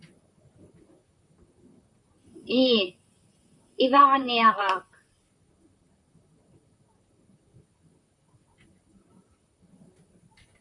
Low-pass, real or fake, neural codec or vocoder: 10.8 kHz; fake; vocoder, 44.1 kHz, 128 mel bands, Pupu-Vocoder